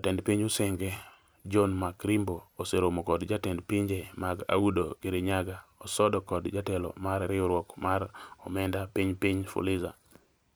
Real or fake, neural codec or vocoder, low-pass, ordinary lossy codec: fake; vocoder, 44.1 kHz, 128 mel bands every 256 samples, BigVGAN v2; none; none